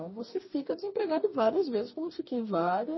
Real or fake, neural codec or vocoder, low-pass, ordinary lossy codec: fake; codec, 44.1 kHz, 2.6 kbps, DAC; 7.2 kHz; MP3, 24 kbps